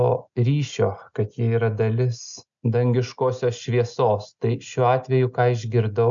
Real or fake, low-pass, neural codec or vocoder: real; 7.2 kHz; none